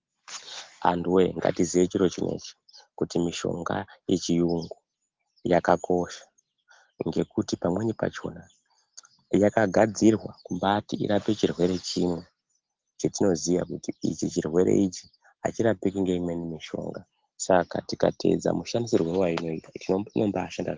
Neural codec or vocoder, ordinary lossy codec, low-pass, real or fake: none; Opus, 16 kbps; 7.2 kHz; real